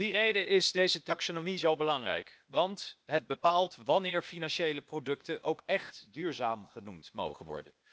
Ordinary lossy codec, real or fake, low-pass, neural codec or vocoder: none; fake; none; codec, 16 kHz, 0.8 kbps, ZipCodec